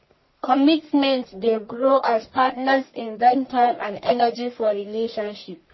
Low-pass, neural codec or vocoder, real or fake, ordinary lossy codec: 7.2 kHz; codec, 44.1 kHz, 1.7 kbps, Pupu-Codec; fake; MP3, 24 kbps